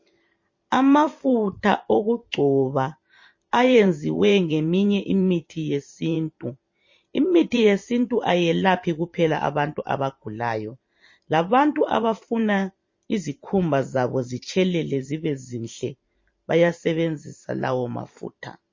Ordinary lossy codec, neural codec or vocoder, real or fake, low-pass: MP3, 32 kbps; vocoder, 44.1 kHz, 128 mel bands every 512 samples, BigVGAN v2; fake; 7.2 kHz